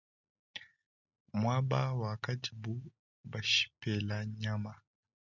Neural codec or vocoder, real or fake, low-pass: none; real; 7.2 kHz